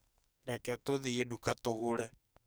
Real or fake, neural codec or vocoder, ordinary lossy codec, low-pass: fake; codec, 44.1 kHz, 2.6 kbps, SNAC; none; none